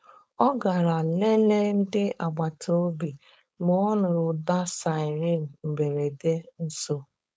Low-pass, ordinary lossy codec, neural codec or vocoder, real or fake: none; none; codec, 16 kHz, 4.8 kbps, FACodec; fake